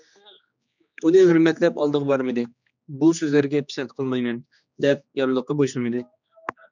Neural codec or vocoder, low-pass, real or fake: codec, 16 kHz, 2 kbps, X-Codec, HuBERT features, trained on general audio; 7.2 kHz; fake